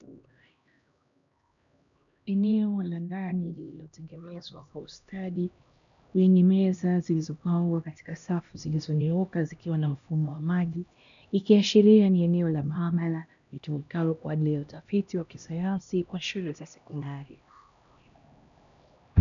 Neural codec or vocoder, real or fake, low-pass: codec, 16 kHz, 1 kbps, X-Codec, HuBERT features, trained on LibriSpeech; fake; 7.2 kHz